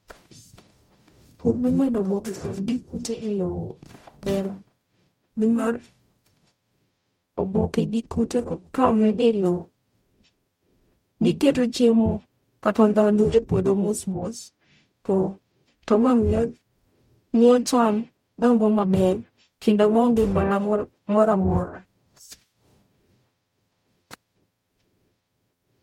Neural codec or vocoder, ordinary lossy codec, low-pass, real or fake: codec, 44.1 kHz, 0.9 kbps, DAC; MP3, 64 kbps; 19.8 kHz; fake